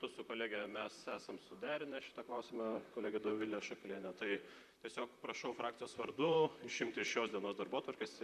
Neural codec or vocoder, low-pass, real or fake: vocoder, 44.1 kHz, 128 mel bands, Pupu-Vocoder; 14.4 kHz; fake